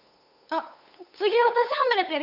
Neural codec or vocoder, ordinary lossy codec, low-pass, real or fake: codec, 16 kHz, 8 kbps, FunCodec, trained on LibriTTS, 25 frames a second; none; 5.4 kHz; fake